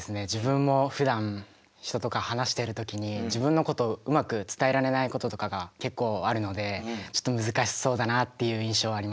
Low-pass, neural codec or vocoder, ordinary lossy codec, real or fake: none; none; none; real